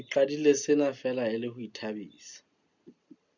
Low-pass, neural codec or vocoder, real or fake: 7.2 kHz; none; real